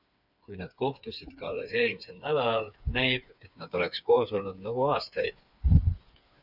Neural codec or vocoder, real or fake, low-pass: codec, 16 kHz, 4 kbps, FreqCodec, smaller model; fake; 5.4 kHz